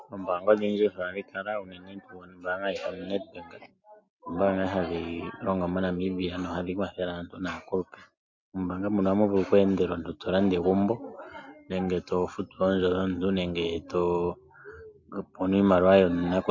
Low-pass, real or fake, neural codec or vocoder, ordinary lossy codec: 7.2 kHz; real; none; MP3, 48 kbps